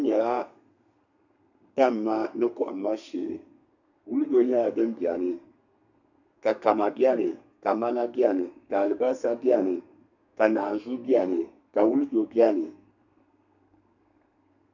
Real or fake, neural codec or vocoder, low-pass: fake; codec, 32 kHz, 1.9 kbps, SNAC; 7.2 kHz